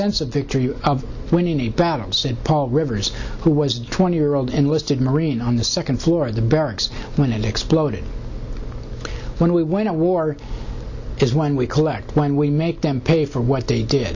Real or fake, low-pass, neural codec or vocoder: real; 7.2 kHz; none